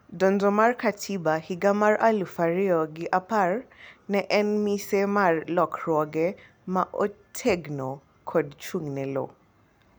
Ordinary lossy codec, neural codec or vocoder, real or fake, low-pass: none; none; real; none